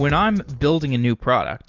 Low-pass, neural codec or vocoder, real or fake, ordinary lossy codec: 7.2 kHz; none; real; Opus, 24 kbps